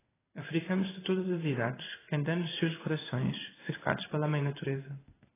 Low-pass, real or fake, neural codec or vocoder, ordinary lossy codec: 3.6 kHz; fake; codec, 16 kHz in and 24 kHz out, 1 kbps, XY-Tokenizer; AAC, 16 kbps